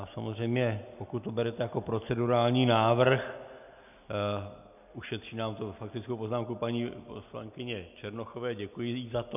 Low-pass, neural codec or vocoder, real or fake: 3.6 kHz; none; real